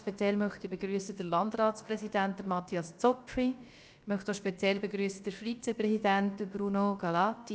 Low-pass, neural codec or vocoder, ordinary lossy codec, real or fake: none; codec, 16 kHz, about 1 kbps, DyCAST, with the encoder's durations; none; fake